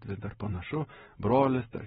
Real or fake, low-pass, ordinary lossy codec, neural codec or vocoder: real; 7.2 kHz; AAC, 16 kbps; none